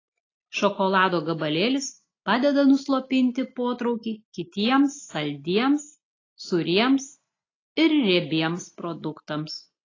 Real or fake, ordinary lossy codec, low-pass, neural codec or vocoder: real; AAC, 32 kbps; 7.2 kHz; none